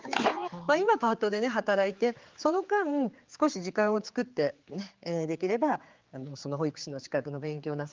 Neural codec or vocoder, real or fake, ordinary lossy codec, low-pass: codec, 16 kHz, 4 kbps, X-Codec, HuBERT features, trained on general audio; fake; Opus, 32 kbps; 7.2 kHz